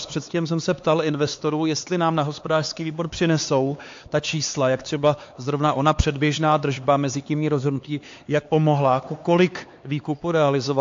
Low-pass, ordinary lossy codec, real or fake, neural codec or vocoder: 7.2 kHz; AAC, 48 kbps; fake; codec, 16 kHz, 4 kbps, X-Codec, HuBERT features, trained on LibriSpeech